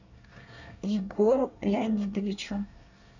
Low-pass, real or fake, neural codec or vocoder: 7.2 kHz; fake; codec, 24 kHz, 1 kbps, SNAC